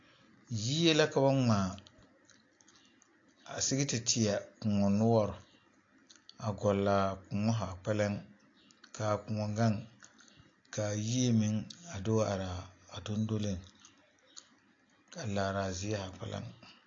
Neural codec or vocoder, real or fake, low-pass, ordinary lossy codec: none; real; 7.2 kHz; AAC, 48 kbps